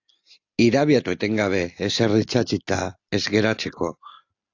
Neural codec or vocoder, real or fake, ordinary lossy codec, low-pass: none; real; AAC, 48 kbps; 7.2 kHz